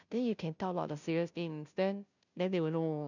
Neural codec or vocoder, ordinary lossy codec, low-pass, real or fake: codec, 16 kHz, 0.5 kbps, FunCodec, trained on Chinese and English, 25 frames a second; none; 7.2 kHz; fake